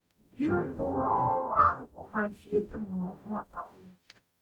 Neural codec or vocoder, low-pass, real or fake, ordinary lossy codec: codec, 44.1 kHz, 0.9 kbps, DAC; 19.8 kHz; fake; none